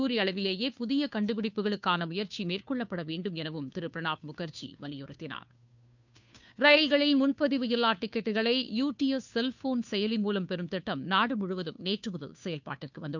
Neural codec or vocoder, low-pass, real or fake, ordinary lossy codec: codec, 16 kHz, 2 kbps, FunCodec, trained on Chinese and English, 25 frames a second; 7.2 kHz; fake; none